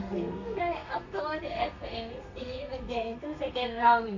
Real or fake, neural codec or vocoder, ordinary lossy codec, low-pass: fake; codec, 32 kHz, 1.9 kbps, SNAC; none; 7.2 kHz